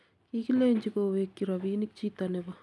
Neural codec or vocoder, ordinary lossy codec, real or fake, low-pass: none; none; real; none